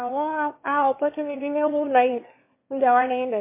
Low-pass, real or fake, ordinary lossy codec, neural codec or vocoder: 3.6 kHz; fake; MP3, 24 kbps; autoencoder, 22.05 kHz, a latent of 192 numbers a frame, VITS, trained on one speaker